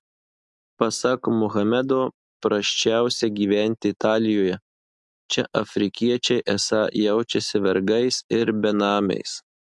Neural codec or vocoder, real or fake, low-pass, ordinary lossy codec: none; real; 10.8 kHz; MP3, 64 kbps